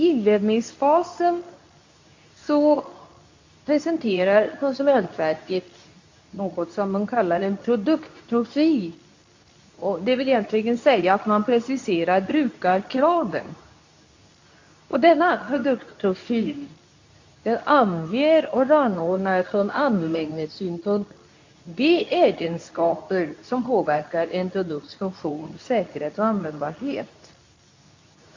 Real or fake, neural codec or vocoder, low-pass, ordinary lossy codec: fake; codec, 24 kHz, 0.9 kbps, WavTokenizer, medium speech release version 2; 7.2 kHz; AAC, 48 kbps